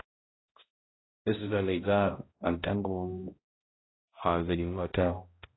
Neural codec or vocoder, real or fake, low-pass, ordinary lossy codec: codec, 16 kHz, 0.5 kbps, X-Codec, HuBERT features, trained on balanced general audio; fake; 7.2 kHz; AAC, 16 kbps